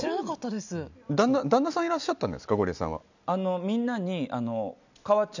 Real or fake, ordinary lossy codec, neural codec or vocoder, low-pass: real; none; none; 7.2 kHz